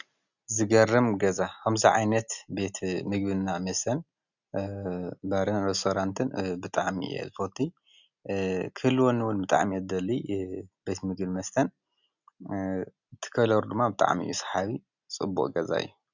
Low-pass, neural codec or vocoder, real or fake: 7.2 kHz; none; real